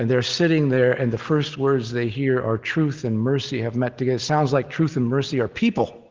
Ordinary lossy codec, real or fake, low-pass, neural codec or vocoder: Opus, 16 kbps; real; 7.2 kHz; none